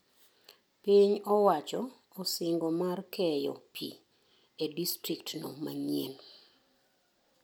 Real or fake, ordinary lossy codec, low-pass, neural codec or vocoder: real; none; none; none